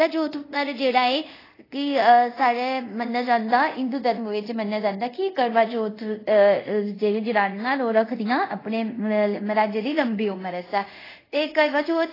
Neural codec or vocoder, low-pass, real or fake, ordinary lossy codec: codec, 24 kHz, 0.5 kbps, DualCodec; 5.4 kHz; fake; AAC, 24 kbps